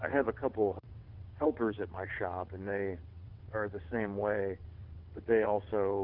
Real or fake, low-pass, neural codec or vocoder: fake; 5.4 kHz; vocoder, 22.05 kHz, 80 mel bands, WaveNeXt